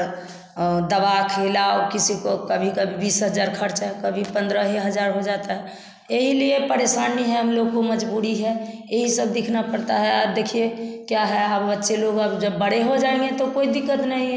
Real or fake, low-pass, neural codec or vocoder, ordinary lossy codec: real; none; none; none